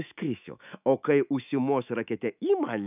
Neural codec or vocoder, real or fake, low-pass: autoencoder, 48 kHz, 128 numbers a frame, DAC-VAE, trained on Japanese speech; fake; 3.6 kHz